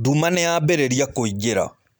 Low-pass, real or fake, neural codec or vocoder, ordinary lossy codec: none; real; none; none